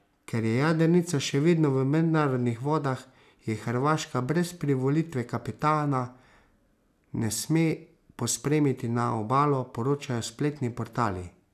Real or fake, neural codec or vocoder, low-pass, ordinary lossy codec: real; none; 14.4 kHz; none